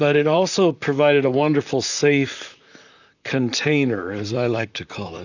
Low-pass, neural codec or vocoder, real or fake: 7.2 kHz; vocoder, 44.1 kHz, 128 mel bands, Pupu-Vocoder; fake